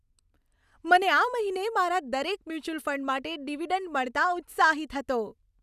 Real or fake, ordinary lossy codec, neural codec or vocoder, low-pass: real; none; none; 14.4 kHz